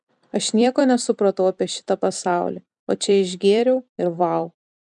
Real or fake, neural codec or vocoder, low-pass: fake; vocoder, 44.1 kHz, 128 mel bands every 256 samples, BigVGAN v2; 10.8 kHz